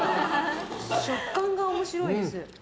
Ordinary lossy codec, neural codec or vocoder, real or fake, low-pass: none; none; real; none